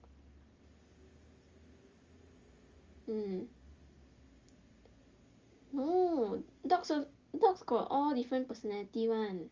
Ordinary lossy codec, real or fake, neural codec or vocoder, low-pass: Opus, 32 kbps; real; none; 7.2 kHz